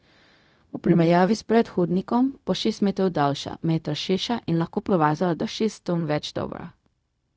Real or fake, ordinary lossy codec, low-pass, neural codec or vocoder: fake; none; none; codec, 16 kHz, 0.4 kbps, LongCat-Audio-Codec